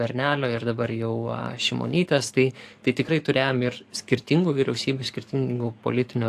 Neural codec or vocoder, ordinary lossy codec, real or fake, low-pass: codec, 44.1 kHz, 7.8 kbps, DAC; AAC, 64 kbps; fake; 14.4 kHz